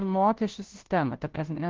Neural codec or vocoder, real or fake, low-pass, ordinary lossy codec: codec, 16 kHz, 0.5 kbps, FunCodec, trained on Chinese and English, 25 frames a second; fake; 7.2 kHz; Opus, 16 kbps